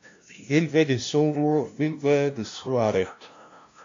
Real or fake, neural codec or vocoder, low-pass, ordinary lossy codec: fake; codec, 16 kHz, 0.5 kbps, FunCodec, trained on LibriTTS, 25 frames a second; 7.2 kHz; AAC, 48 kbps